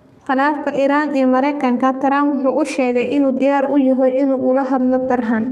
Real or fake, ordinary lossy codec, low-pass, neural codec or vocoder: fake; none; 14.4 kHz; codec, 32 kHz, 1.9 kbps, SNAC